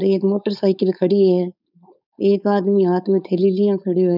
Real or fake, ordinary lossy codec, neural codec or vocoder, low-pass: fake; none; codec, 16 kHz, 4.8 kbps, FACodec; 5.4 kHz